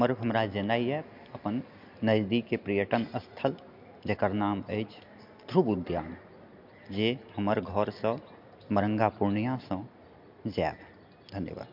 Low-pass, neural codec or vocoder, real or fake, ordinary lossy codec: 5.4 kHz; none; real; MP3, 48 kbps